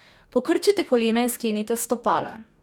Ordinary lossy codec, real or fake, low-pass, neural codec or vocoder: none; fake; 19.8 kHz; codec, 44.1 kHz, 2.6 kbps, DAC